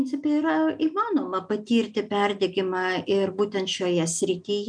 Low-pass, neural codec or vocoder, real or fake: 9.9 kHz; none; real